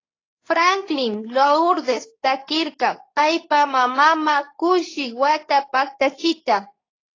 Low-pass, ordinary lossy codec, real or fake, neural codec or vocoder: 7.2 kHz; AAC, 32 kbps; fake; codec, 24 kHz, 0.9 kbps, WavTokenizer, medium speech release version 2